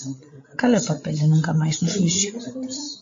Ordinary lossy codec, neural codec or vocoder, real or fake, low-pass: AAC, 48 kbps; codec, 16 kHz, 16 kbps, FreqCodec, larger model; fake; 7.2 kHz